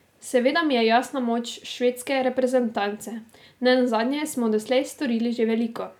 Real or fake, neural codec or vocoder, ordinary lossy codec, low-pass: real; none; none; 19.8 kHz